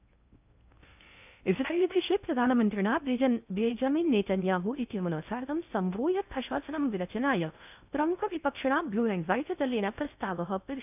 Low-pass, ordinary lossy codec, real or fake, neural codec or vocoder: 3.6 kHz; none; fake; codec, 16 kHz in and 24 kHz out, 0.6 kbps, FocalCodec, streaming, 4096 codes